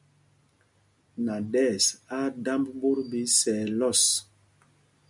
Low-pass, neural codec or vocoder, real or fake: 10.8 kHz; none; real